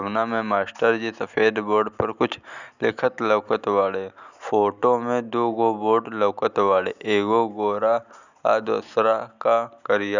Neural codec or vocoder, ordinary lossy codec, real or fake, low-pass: none; none; real; 7.2 kHz